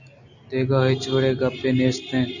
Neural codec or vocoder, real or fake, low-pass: none; real; 7.2 kHz